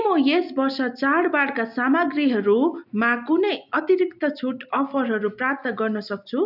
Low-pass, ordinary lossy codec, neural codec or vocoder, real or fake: 5.4 kHz; none; none; real